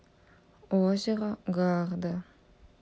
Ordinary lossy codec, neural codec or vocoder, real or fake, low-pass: none; none; real; none